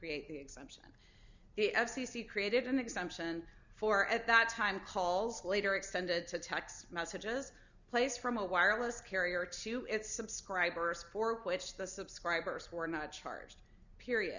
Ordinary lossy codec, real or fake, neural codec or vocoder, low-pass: Opus, 64 kbps; real; none; 7.2 kHz